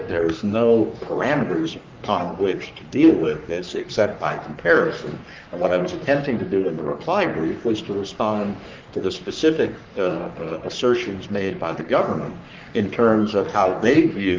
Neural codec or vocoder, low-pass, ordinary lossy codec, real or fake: codec, 44.1 kHz, 3.4 kbps, Pupu-Codec; 7.2 kHz; Opus, 24 kbps; fake